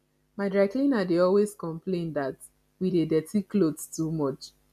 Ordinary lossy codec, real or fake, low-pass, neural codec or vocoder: MP3, 96 kbps; real; 14.4 kHz; none